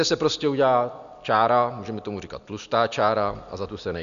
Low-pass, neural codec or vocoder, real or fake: 7.2 kHz; none; real